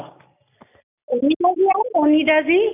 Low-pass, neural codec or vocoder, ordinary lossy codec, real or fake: 3.6 kHz; none; none; real